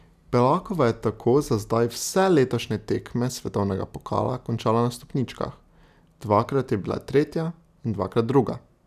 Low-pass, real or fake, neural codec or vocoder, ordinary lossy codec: 14.4 kHz; real; none; none